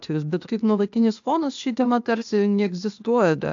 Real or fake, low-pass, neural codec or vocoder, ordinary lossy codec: fake; 7.2 kHz; codec, 16 kHz, 0.8 kbps, ZipCodec; AAC, 64 kbps